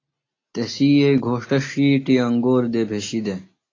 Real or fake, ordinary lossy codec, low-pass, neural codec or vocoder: real; AAC, 32 kbps; 7.2 kHz; none